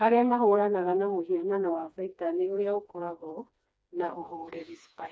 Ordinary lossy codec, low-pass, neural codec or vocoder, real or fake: none; none; codec, 16 kHz, 2 kbps, FreqCodec, smaller model; fake